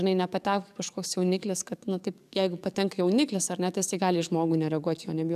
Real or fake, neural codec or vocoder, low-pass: fake; codec, 44.1 kHz, 7.8 kbps, DAC; 14.4 kHz